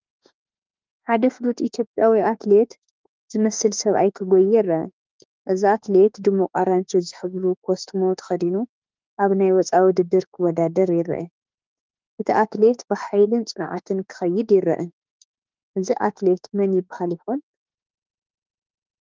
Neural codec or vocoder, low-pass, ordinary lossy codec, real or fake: autoencoder, 48 kHz, 32 numbers a frame, DAC-VAE, trained on Japanese speech; 7.2 kHz; Opus, 24 kbps; fake